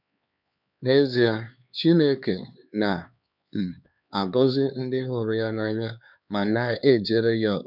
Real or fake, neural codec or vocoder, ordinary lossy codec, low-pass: fake; codec, 16 kHz, 4 kbps, X-Codec, HuBERT features, trained on LibriSpeech; none; 5.4 kHz